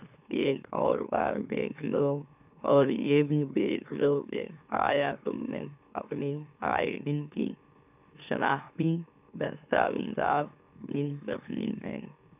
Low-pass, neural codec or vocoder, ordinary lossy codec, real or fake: 3.6 kHz; autoencoder, 44.1 kHz, a latent of 192 numbers a frame, MeloTTS; none; fake